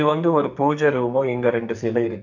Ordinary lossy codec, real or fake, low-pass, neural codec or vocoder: none; fake; 7.2 kHz; codec, 44.1 kHz, 2.6 kbps, SNAC